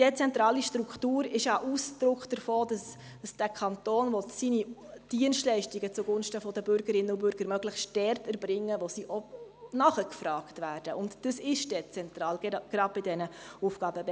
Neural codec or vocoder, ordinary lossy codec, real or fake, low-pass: none; none; real; none